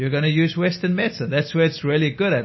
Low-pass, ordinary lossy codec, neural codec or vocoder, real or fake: 7.2 kHz; MP3, 24 kbps; none; real